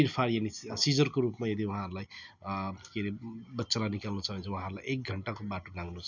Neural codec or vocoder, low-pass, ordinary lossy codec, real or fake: none; 7.2 kHz; none; real